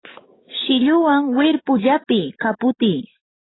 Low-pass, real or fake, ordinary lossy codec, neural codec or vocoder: 7.2 kHz; fake; AAC, 16 kbps; codec, 44.1 kHz, 7.8 kbps, DAC